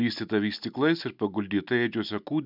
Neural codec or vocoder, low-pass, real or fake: none; 5.4 kHz; real